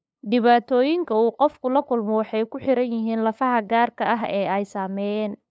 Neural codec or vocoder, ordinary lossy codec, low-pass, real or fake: codec, 16 kHz, 8 kbps, FunCodec, trained on LibriTTS, 25 frames a second; none; none; fake